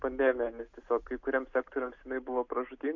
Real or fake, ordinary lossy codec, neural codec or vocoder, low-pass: real; MP3, 48 kbps; none; 7.2 kHz